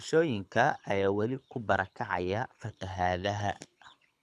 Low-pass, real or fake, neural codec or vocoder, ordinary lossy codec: none; fake; codec, 24 kHz, 6 kbps, HILCodec; none